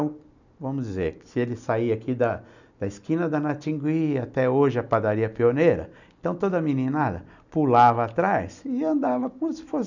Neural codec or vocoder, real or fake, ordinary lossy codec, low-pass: none; real; none; 7.2 kHz